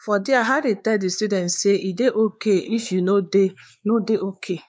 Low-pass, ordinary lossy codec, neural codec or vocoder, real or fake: none; none; codec, 16 kHz, 4 kbps, X-Codec, WavLM features, trained on Multilingual LibriSpeech; fake